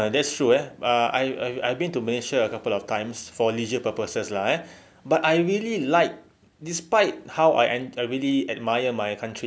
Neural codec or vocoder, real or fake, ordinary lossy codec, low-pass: none; real; none; none